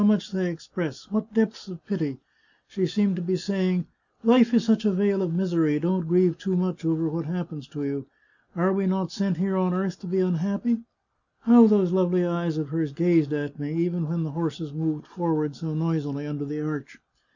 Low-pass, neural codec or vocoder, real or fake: 7.2 kHz; none; real